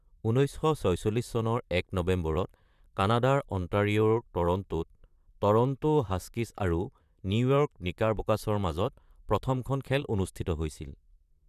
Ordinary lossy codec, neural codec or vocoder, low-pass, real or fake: none; vocoder, 44.1 kHz, 128 mel bands, Pupu-Vocoder; 14.4 kHz; fake